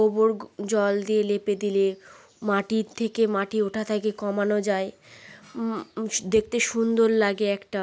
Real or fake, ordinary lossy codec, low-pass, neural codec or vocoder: real; none; none; none